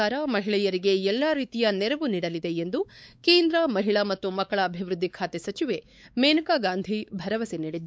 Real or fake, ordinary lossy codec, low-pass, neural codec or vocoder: fake; none; none; codec, 16 kHz, 4 kbps, X-Codec, WavLM features, trained on Multilingual LibriSpeech